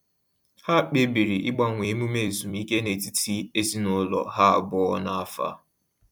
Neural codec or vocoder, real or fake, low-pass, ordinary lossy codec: vocoder, 44.1 kHz, 128 mel bands every 256 samples, BigVGAN v2; fake; 19.8 kHz; MP3, 96 kbps